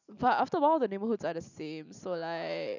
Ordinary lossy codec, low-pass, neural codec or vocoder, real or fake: none; 7.2 kHz; none; real